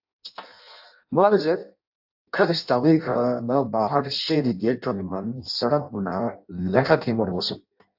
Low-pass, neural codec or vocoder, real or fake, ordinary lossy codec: 5.4 kHz; codec, 16 kHz in and 24 kHz out, 0.6 kbps, FireRedTTS-2 codec; fake; AAC, 48 kbps